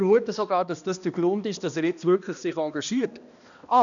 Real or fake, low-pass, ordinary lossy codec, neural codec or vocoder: fake; 7.2 kHz; none; codec, 16 kHz, 1 kbps, X-Codec, HuBERT features, trained on balanced general audio